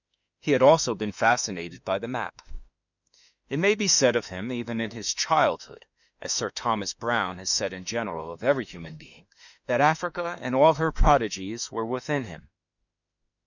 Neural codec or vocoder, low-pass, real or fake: autoencoder, 48 kHz, 32 numbers a frame, DAC-VAE, trained on Japanese speech; 7.2 kHz; fake